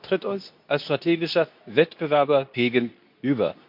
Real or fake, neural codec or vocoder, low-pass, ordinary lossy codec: fake; codec, 24 kHz, 0.9 kbps, WavTokenizer, medium speech release version 2; 5.4 kHz; MP3, 48 kbps